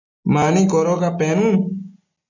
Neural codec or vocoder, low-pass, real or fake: none; 7.2 kHz; real